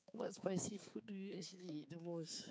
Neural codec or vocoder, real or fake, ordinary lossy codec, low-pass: codec, 16 kHz, 4 kbps, X-Codec, HuBERT features, trained on balanced general audio; fake; none; none